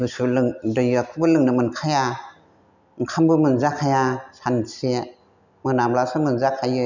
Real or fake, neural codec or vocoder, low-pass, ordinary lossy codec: real; none; 7.2 kHz; none